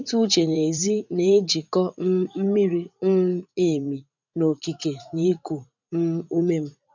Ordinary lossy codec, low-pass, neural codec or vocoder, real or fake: none; 7.2 kHz; vocoder, 44.1 kHz, 80 mel bands, Vocos; fake